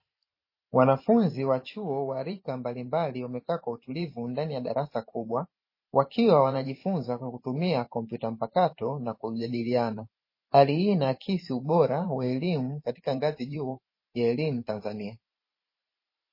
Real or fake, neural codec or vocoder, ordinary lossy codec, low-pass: fake; vocoder, 44.1 kHz, 128 mel bands every 512 samples, BigVGAN v2; MP3, 24 kbps; 5.4 kHz